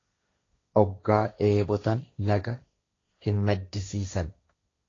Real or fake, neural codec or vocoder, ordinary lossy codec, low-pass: fake; codec, 16 kHz, 1.1 kbps, Voila-Tokenizer; AAC, 32 kbps; 7.2 kHz